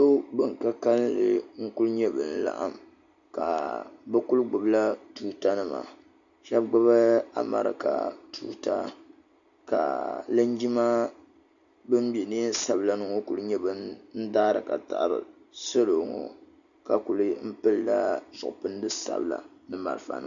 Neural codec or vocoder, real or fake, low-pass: none; real; 7.2 kHz